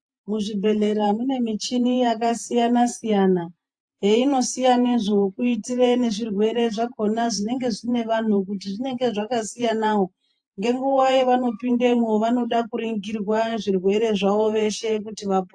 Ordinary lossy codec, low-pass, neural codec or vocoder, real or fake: AAC, 64 kbps; 9.9 kHz; vocoder, 48 kHz, 128 mel bands, Vocos; fake